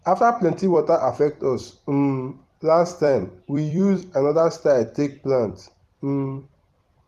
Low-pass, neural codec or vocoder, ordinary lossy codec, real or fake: 14.4 kHz; vocoder, 44.1 kHz, 128 mel bands every 256 samples, BigVGAN v2; Opus, 24 kbps; fake